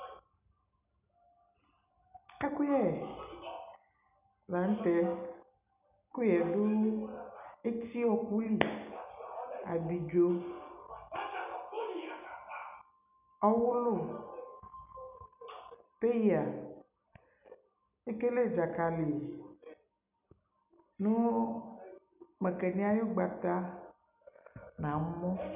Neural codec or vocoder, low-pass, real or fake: none; 3.6 kHz; real